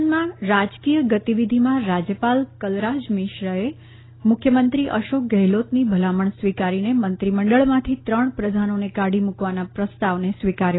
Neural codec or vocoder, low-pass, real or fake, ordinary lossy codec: none; 7.2 kHz; real; AAC, 16 kbps